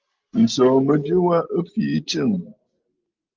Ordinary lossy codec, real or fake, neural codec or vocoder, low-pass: Opus, 32 kbps; real; none; 7.2 kHz